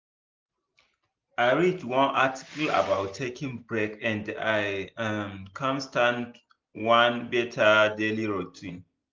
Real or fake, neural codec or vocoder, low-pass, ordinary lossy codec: real; none; 7.2 kHz; Opus, 24 kbps